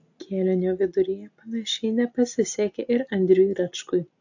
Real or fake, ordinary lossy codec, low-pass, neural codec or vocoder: real; AAC, 48 kbps; 7.2 kHz; none